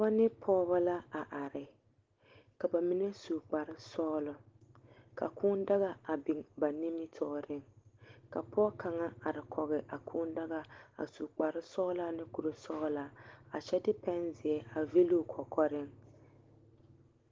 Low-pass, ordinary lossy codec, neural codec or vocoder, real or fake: 7.2 kHz; Opus, 16 kbps; none; real